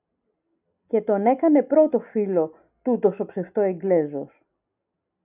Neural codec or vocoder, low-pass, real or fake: none; 3.6 kHz; real